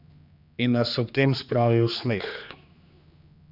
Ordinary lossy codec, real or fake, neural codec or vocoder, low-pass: none; fake; codec, 16 kHz, 2 kbps, X-Codec, HuBERT features, trained on general audio; 5.4 kHz